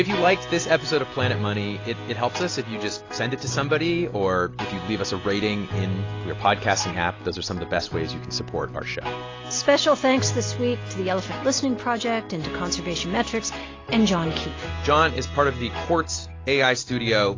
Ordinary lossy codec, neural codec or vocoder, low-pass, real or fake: AAC, 32 kbps; none; 7.2 kHz; real